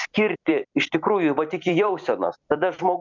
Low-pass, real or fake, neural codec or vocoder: 7.2 kHz; fake; vocoder, 44.1 kHz, 128 mel bands every 256 samples, BigVGAN v2